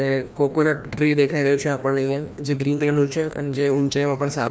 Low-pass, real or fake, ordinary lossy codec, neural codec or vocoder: none; fake; none; codec, 16 kHz, 1 kbps, FreqCodec, larger model